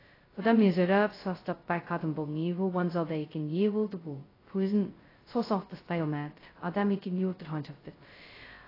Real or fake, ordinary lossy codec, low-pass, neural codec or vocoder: fake; AAC, 24 kbps; 5.4 kHz; codec, 16 kHz, 0.2 kbps, FocalCodec